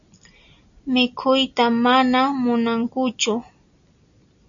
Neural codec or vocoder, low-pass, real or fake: none; 7.2 kHz; real